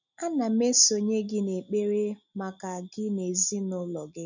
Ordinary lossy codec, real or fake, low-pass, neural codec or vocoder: none; real; 7.2 kHz; none